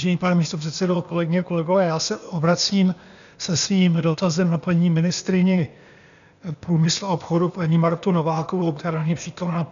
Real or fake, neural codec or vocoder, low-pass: fake; codec, 16 kHz, 0.8 kbps, ZipCodec; 7.2 kHz